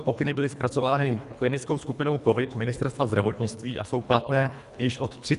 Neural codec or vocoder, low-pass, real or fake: codec, 24 kHz, 1.5 kbps, HILCodec; 10.8 kHz; fake